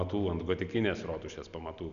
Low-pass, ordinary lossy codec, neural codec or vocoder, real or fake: 7.2 kHz; MP3, 96 kbps; none; real